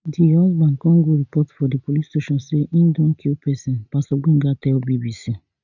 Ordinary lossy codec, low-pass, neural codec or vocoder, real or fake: none; 7.2 kHz; none; real